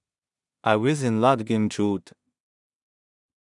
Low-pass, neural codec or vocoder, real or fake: 10.8 kHz; codec, 16 kHz in and 24 kHz out, 0.4 kbps, LongCat-Audio-Codec, two codebook decoder; fake